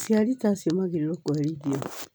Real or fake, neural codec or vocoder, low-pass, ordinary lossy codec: real; none; none; none